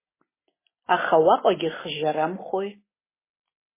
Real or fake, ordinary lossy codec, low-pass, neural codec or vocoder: real; MP3, 16 kbps; 3.6 kHz; none